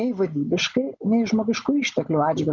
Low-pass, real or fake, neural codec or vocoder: 7.2 kHz; real; none